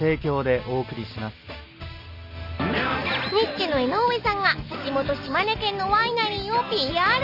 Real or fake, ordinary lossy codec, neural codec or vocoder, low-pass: real; none; none; 5.4 kHz